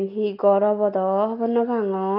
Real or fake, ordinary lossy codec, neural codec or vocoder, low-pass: real; none; none; 5.4 kHz